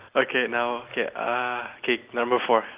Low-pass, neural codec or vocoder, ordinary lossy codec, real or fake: 3.6 kHz; none; Opus, 32 kbps; real